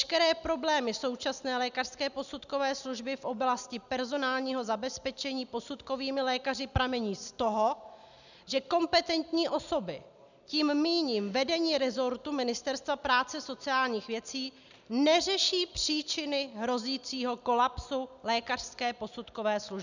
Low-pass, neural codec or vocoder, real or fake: 7.2 kHz; none; real